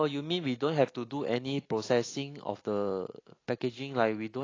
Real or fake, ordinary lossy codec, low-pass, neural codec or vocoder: real; AAC, 32 kbps; 7.2 kHz; none